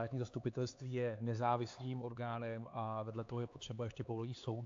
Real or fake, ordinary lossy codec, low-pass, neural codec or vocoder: fake; AAC, 48 kbps; 7.2 kHz; codec, 16 kHz, 4 kbps, X-Codec, HuBERT features, trained on LibriSpeech